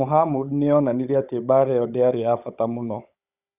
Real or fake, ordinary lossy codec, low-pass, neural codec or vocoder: fake; none; 3.6 kHz; codec, 16 kHz, 16 kbps, FunCodec, trained on Chinese and English, 50 frames a second